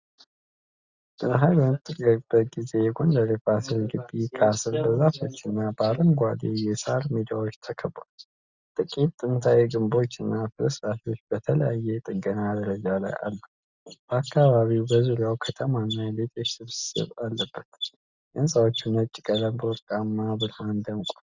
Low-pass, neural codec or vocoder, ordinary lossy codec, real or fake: 7.2 kHz; none; Opus, 64 kbps; real